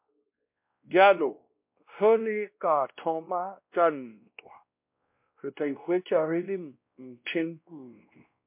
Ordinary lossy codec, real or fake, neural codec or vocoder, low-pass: MP3, 32 kbps; fake; codec, 16 kHz, 1 kbps, X-Codec, WavLM features, trained on Multilingual LibriSpeech; 3.6 kHz